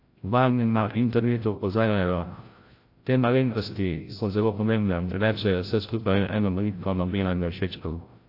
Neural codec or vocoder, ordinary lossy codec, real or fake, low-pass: codec, 16 kHz, 0.5 kbps, FreqCodec, larger model; AAC, 32 kbps; fake; 5.4 kHz